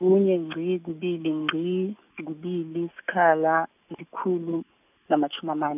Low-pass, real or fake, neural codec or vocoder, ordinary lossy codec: 3.6 kHz; real; none; none